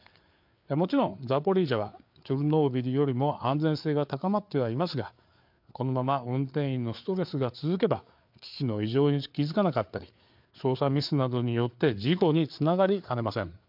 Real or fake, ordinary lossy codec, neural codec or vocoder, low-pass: fake; MP3, 48 kbps; codec, 16 kHz, 4 kbps, FreqCodec, larger model; 5.4 kHz